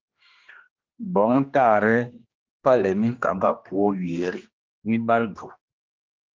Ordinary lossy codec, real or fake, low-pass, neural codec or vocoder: Opus, 32 kbps; fake; 7.2 kHz; codec, 16 kHz, 1 kbps, X-Codec, HuBERT features, trained on general audio